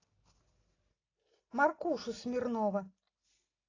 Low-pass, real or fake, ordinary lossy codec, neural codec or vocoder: 7.2 kHz; real; AAC, 32 kbps; none